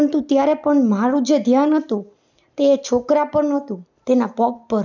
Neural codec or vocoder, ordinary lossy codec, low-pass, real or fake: none; none; 7.2 kHz; real